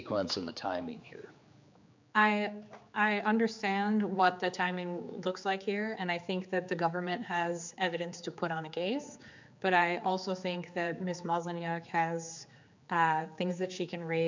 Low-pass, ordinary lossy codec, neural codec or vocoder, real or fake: 7.2 kHz; MP3, 64 kbps; codec, 16 kHz, 4 kbps, X-Codec, HuBERT features, trained on general audio; fake